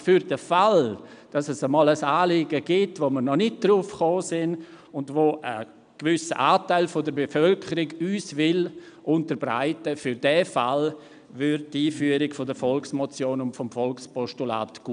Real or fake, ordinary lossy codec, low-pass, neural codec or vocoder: real; none; 9.9 kHz; none